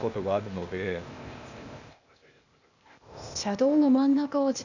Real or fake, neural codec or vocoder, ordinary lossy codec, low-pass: fake; codec, 16 kHz, 0.8 kbps, ZipCodec; none; 7.2 kHz